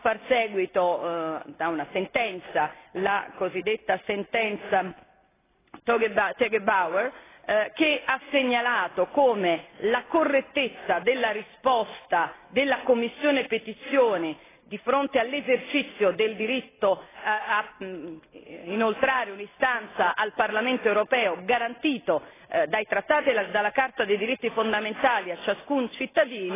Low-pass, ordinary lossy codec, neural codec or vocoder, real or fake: 3.6 kHz; AAC, 16 kbps; none; real